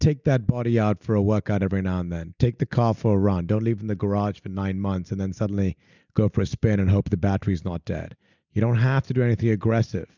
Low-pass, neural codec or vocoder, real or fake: 7.2 kHz; none; real